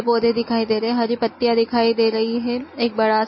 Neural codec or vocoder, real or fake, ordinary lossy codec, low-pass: none; real; MP3, 24 kbps; 7.2 kHz